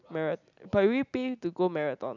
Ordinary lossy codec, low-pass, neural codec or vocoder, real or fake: none; 7.2 kHz; none; real